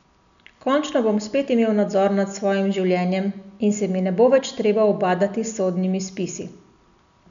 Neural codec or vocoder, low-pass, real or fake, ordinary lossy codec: none; 7.2 kHz; real; none